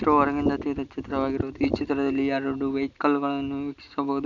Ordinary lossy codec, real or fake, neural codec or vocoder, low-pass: none; real; none; 7.2 kHz